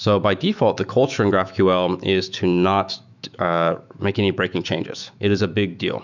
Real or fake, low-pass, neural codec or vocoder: fake; 7.2 kHz; autoencoder, 48 kHz, 128 numbers a frame, DAC-VAE, trained on Japanese speech